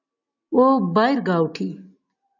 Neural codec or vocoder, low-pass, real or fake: none; 7.2 kHz; real